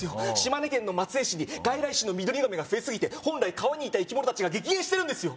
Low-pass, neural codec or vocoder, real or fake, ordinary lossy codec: none; none; real; none